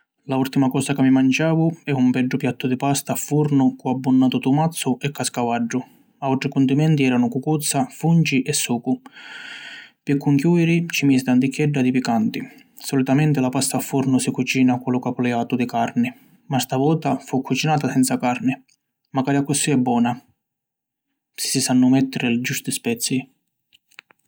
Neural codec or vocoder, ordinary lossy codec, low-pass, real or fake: none; none; none; real